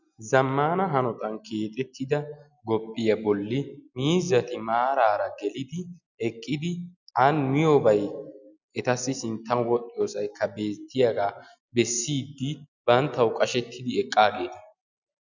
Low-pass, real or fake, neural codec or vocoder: 7.2 kHz; real; none